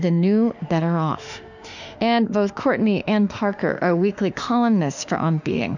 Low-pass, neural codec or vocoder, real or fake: 7.2 kHz; autoencoder, 48 kHz, 32 numbers a frame, DAC-VAE, trained on Japanese speech; fake